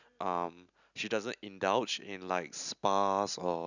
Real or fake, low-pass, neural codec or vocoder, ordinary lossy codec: real; 7.2 kHz; none; none